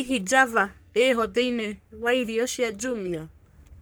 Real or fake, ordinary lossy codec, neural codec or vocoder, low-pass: fake; none; codec, 44.1 kHz, 3.4 kbps, Pupu-Codec; none